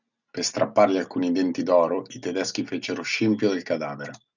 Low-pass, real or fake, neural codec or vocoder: 7.2 kHz; real; none